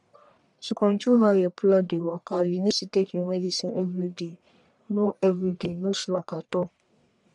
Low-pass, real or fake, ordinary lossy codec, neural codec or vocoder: 10.8 kHz; fake; none; codec, 44.1 kHz, 1.7 kbps, Pupu-Codec